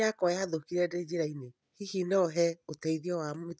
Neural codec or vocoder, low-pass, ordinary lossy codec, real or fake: none; none; none; real